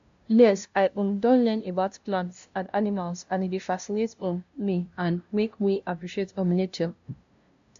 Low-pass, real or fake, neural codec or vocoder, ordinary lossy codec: 7.2 kHz; fake; codec, 16 kHz, 0.5 kbps, FunCodec, trained on LibriTTS, 25 frames a second; AAC, 64 kbps